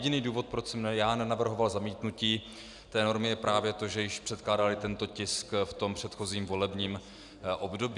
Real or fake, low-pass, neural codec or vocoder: real; 10.8 kHz; none